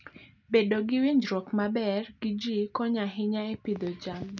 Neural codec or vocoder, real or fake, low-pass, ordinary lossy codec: none; real; 7.2 kHz; none